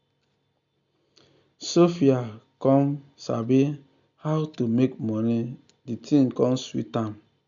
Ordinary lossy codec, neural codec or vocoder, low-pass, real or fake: none; none; 7.2 kHz; real